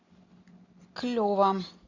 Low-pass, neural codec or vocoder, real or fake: 7.2 kHz; none; real